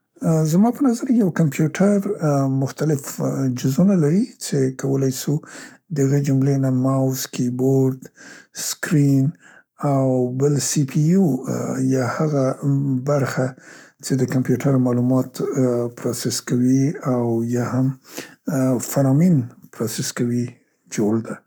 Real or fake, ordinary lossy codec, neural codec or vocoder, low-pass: fake; none; codec, 44.1 kHz, 7.8 kbps, Pupu-Codec; none